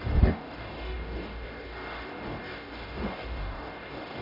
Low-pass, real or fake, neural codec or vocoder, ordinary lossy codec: 5.4 kHz; fake; codec, 44.1 kHz, 0.9 kbps, DAC; none